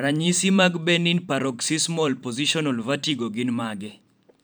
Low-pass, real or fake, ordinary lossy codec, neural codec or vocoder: none; fake; none; vocoder, 44.1 kHz, 128 mel bands every 512 samples, BigVGAN v2